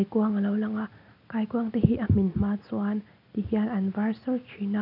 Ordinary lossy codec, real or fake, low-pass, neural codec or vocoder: none; real; 5.4 kHz; none